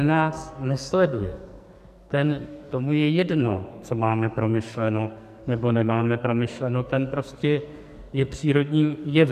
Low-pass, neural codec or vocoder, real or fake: 14.4 kHz; codec, 44.1 kHz, 2.6 kbps, SNAC; fake